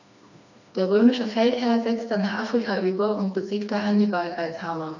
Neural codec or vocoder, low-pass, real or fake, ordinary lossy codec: codec, 16 kHz, 2 kbps, FreqCodec, smaller model; 7.2 kHz; fake; none